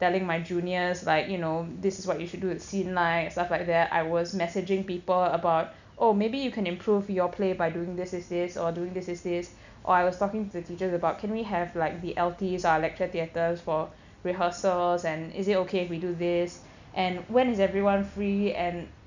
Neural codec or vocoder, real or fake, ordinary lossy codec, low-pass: none; real; none; 7.2 kHz